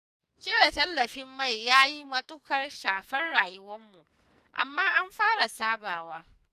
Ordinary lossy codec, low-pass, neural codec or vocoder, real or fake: none; 14.4 kHz; codec, 44.1 kHz, 2.6 kbps, SNAC; fake